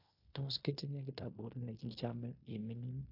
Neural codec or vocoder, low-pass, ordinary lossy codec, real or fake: codec, 16 kHz in and 24 kHz out, 0.9 kbps, LongCat-Audio-Codec, fine tuned four codebook decoder; 5.4 kHz; AAC, 32 kbps; fake